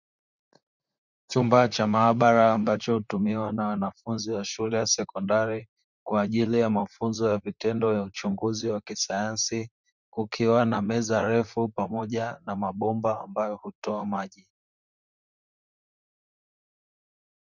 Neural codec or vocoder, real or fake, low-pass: vocoder, 44.1 kHz, 128 mel bands, Pupu-Vocoder; fake; 7.2 kHz